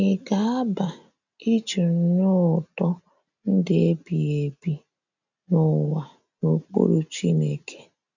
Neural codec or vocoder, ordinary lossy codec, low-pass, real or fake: none; none; 7.2 kHz; real